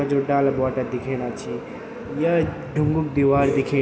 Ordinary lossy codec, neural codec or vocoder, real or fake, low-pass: none; none; real; none